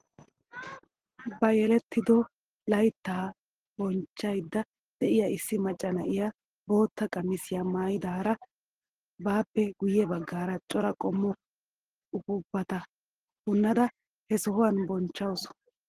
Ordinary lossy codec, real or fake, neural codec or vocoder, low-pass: Opus, 16 kbps; real; none; 14.4 kHz